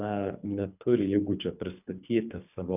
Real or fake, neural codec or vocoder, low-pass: fake; codec, 24 kHz, 3 kbps, HILCodec; 3.6 kHz